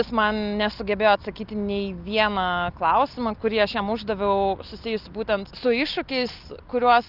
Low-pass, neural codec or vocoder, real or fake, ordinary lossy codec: 5.4 kHz; none; real; Opus, 32 kbps